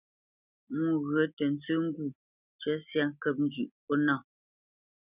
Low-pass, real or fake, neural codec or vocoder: 3.6 kHz; real; none